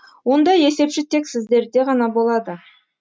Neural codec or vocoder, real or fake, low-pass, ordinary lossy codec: none; real; none; none